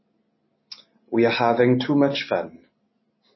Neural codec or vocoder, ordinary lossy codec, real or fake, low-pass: none; MP3, 24 kbps; real; 7.2 kHz